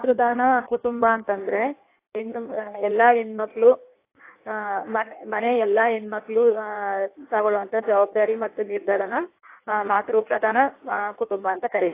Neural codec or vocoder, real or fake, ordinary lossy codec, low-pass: codec, 16 kHz in and 24 kHz out, 1.1 kbps, FireRedTTS-2 codec; fake; AAC, 24 kbps; 3.6 kHz